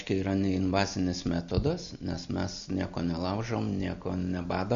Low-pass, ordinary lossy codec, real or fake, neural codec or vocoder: 7.2 kHz; Opus, 64 kbps; real; none